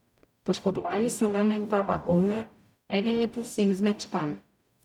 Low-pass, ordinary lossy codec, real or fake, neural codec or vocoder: 19.8 kHz; none; fake; codec, 44.1 kHz, 0.9 kbps, DAC